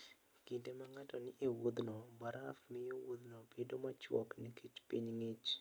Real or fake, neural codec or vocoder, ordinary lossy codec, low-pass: real; none; none; none